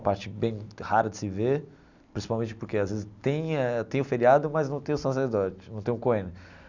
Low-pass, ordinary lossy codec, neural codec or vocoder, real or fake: 7.2 kHz; none; none; real